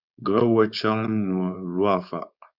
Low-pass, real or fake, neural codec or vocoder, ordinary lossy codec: 5.4 kHz; fake; codec, 16 kHz, 4.8 kbps, FACodec; Opus, 64 kbps